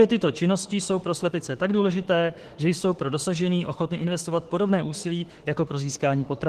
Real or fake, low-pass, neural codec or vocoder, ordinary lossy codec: fake; 14.4 kHz; autoencoder, 48 kHz, 32 numbers a frame, DAC-VAE, trained on Japanese speech; Opus, 16 kbps